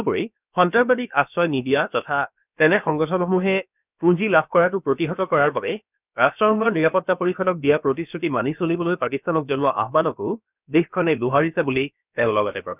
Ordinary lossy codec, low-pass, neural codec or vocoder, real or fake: none; 3.6 kHz; codec, 16 kHz, about 1 kbps, DyCAST, with the encoder's durations; fake